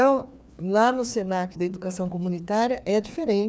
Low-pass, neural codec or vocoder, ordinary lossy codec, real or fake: none; codec, 16 kHz, 2 kbps, FreqCodec, larger model; none; fake